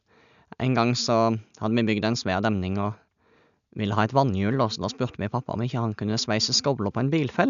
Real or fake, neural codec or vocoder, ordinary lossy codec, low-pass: real; none; none; 7.2 kHz